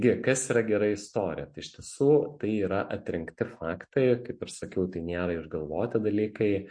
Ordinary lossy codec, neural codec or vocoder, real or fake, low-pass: MP3, 48 kbps; none; real; 9.9 kHz